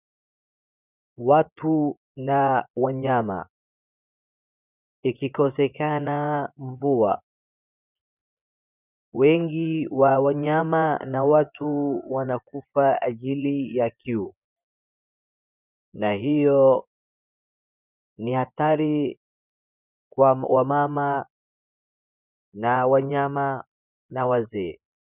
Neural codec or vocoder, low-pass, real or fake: vocoder, 24 kHz, 100 mel bands, Vocos; 3.6 kHz; fake